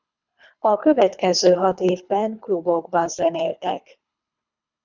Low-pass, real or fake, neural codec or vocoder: 7.2 kHz; fake; codec, 24 kHz, 3 kbps, HILCodec